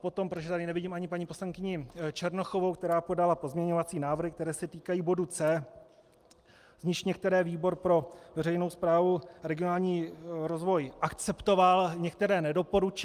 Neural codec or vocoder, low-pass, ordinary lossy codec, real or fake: none; 14.4 kHz; Opus, 32 kbps; real